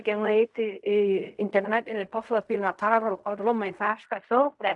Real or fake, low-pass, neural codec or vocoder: fake; 10.8 kHz; codec, 16 kHz in and 24 kHz out, 0.4 kbps, LongCat-Audio-Codec, fine tuned four codebook decoder